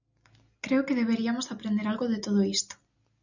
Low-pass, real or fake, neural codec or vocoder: 7.2 kHz; real; none